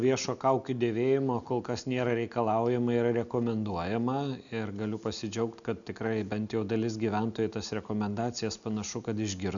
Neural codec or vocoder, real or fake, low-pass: none; real; 7.2 kHz